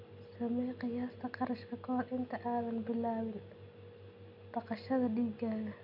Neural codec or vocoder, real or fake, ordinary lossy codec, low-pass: none; real; none; 5.4 kHz